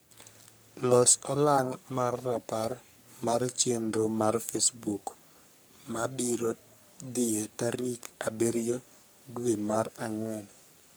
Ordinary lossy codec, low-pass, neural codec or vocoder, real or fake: none; none; codec, 44.1 kHz, 3.4 kbps, Pupu-Codec; fake